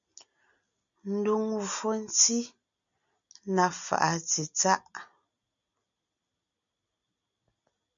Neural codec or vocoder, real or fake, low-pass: none; real; 7.2 kHz